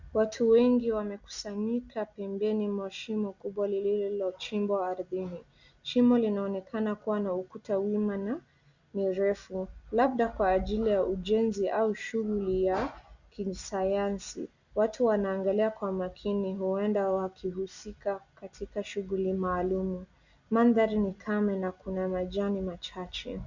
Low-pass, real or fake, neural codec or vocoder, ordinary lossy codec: 7.2 kHz; real; none; Opus, 64 kbps